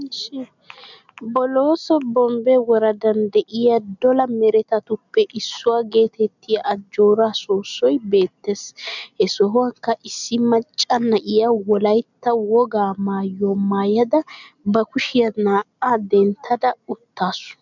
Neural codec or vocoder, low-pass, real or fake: none; 7.2 kHz; real